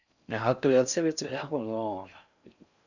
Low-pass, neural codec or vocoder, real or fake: 7.2 kHz; codec, 16 kHz in and 24 kHz out, 0.6 kbps, FocalCodec, streaming, 4096 codes; fake